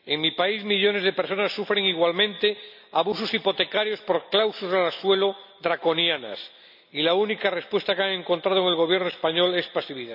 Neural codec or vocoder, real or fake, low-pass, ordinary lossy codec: none; real; 5.4 kHz; none